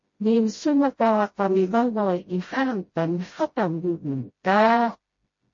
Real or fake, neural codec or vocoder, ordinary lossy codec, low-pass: fake; codec, 16 kHz, 0.5 kbps, FreqCodec, smaller model; MP3, 32 kbps; 7.2 kHz